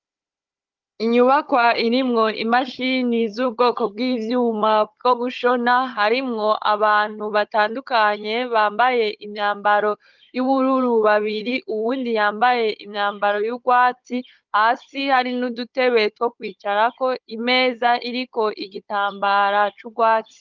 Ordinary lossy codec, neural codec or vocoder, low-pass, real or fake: Opus, 32 kbps; codec, 16 kHz, 16 kbps, FunCodec, trained on Chinese and English, 50 frames a second; 7.2 kHz; fake